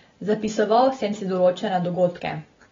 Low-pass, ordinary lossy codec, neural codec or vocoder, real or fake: 7.2 kHz; AAC, 24 kbps; none; real